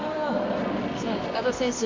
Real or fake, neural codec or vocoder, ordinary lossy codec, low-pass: fake; codec, 24 kHz, 0.9 kbps, WavTokenizer, medium music audio release; MP3, 48 kbps; 7.2 kHz